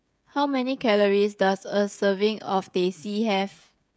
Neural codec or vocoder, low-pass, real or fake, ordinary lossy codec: codec, 16 kHz, 16 kbps, FreqCodec, smaller model; none; fake; none